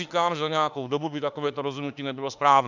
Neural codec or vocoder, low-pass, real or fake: autoencoder, 48 kHz, 32 numbers a frame, DAC-VAE, trained on Japanese speech; 7.2 kHz; fake